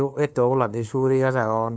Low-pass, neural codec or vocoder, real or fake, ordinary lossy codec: none; codec, 16 kHz, 2 kbps, FunCodec, trained on LibriTTS, 25 frames a second; fake; none